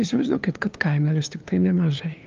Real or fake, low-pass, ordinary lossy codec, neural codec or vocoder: fake; 7.2 kHz; Opus, 32 kbps; codec, 16 kHz, 2 kbps, FunCodec, trained on LibriTTS, 25 frames a second